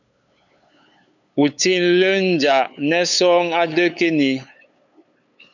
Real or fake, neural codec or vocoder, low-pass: fake; codec, 16 kHz, 8 kbps, FunCodec, trained on LibriTTS, 25 frames a second; 7.2 kHz